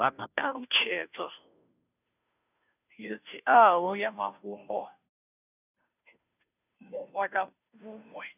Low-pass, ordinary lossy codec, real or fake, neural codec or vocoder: 3.6 kHz; none; fake; codec, 16 kHz, 0.5 kbps, FunCodec, trained on Chinese and English, 25 frames a second